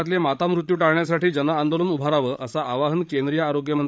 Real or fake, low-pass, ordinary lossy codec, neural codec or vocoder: fake; none; none; codec, 16 kHz, 16 kbps, FreqCodec, larger model